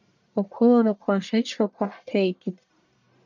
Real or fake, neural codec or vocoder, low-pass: fake; codec, 44.1 kHz, 1.7 kbps, Pupu-Codec; 7.2 kHz